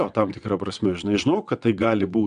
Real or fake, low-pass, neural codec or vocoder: fake; 9.9 kHz; vocoder, 22.05 kHz, 80 mel bands, WaveNeXt